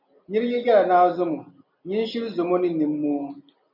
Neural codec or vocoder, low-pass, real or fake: none; 5.4 kHz; real